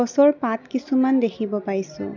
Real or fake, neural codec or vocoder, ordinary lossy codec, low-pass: real; none; none; 7.2 kHz